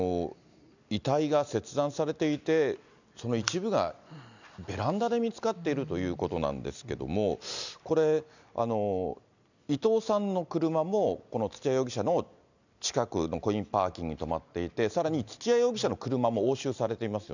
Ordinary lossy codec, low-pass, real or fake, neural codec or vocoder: none; 7.2 kHz; real; none